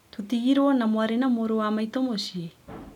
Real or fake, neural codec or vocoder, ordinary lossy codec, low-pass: real; none; none; 19.8 kHz